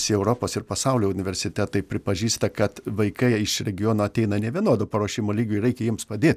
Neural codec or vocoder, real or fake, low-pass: none; real; 14.4 kHz